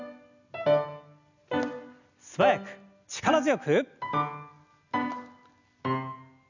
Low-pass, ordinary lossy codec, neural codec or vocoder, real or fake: 7.2 kHz; none; none; real